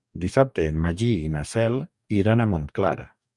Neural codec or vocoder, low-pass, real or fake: codec, 44.1 kHz, 2.6 kbps, DAC; 10.8 kHz; fake